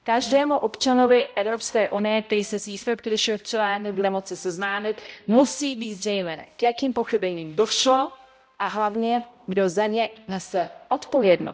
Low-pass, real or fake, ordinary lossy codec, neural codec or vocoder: none; fake; none; codec, 16 kHz, 0.5 kbps, X-Codec, HuBERT features, trained on balanced general audio